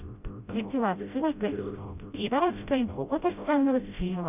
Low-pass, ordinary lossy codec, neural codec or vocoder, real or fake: 3.6 kHz; none; codec, 16 kHz, 0.5 kbps, FreqCodec, smaller model; fake